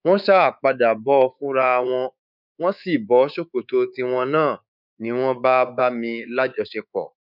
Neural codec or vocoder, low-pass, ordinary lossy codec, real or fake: codec, 24 kHz, 3.1 kbps, DualCodec; 5.4 kHz; none; fake